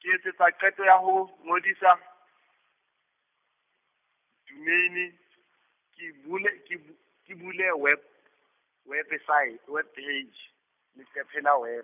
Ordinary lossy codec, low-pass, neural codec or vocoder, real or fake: none; 3.6 kHz; none; real